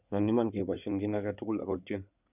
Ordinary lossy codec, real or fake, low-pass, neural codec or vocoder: none; fake; 3.6 kHz; codec, 16 kHz in and 24 kHz out, 2.2 kbps, FireRedTTS-2 codec